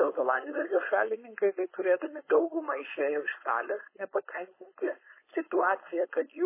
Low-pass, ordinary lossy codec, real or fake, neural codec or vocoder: 3.6 kHz; MP3, 16 kbps; fake; codec, 16 kHz, 4.8 kbps, FACodec